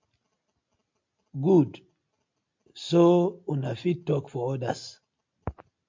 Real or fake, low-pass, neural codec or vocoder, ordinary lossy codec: real; 7.2 kHz; none; MP3, 48 kbps